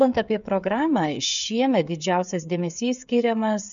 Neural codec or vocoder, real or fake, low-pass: codec, 16 kHz, 8 kbps, FreqCodec, smaller model; fake; 7.2 kHz